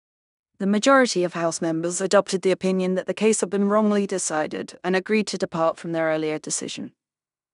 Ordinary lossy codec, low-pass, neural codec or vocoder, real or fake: none; 10.8 kHz; codec, 16 kHz in and 24 kHz out, 0.9 kbps, LongCat-Audio-Codec, fine tuned four codebook decoder; fake